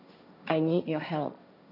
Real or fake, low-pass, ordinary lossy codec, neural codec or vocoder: fake; 5.4 kHz; none; codec, 16 kHz, 1.1 kbps, Voila-Tokenizer